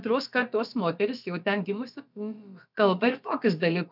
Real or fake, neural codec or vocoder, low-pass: fake; codec, 16 kHz, about 1 kbps, DyCAST, with the encoder's durations; 5.4 kHz